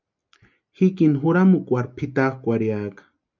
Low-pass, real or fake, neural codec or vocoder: 7.2 kHz; real; none